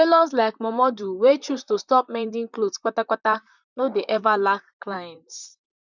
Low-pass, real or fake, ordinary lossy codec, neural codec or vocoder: 7.2 kHz; fake; none; vocoder, 44.1 kHz, 128 mel bands, Pupu-Vocoder